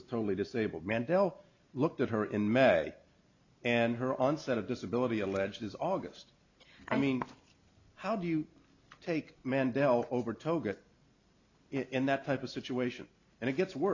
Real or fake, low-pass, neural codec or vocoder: real; 7.2 kHz; none